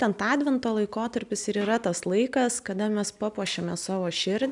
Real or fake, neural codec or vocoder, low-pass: real; none; 10.8 kHz